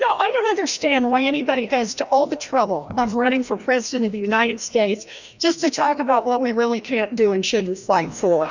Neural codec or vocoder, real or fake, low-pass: codec, 16 kHz, 1 kbps, FreqCodec, larger model; fake; 7.2 kHz